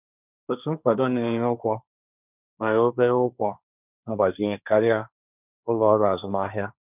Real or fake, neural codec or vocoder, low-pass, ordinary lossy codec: fake; codec, 16 kHz, 1.1 kbps, Voila-Tokenizer; 3.6 kHz; none